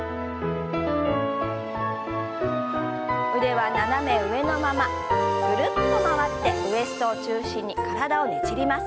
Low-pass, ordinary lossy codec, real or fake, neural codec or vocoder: none; none; real; none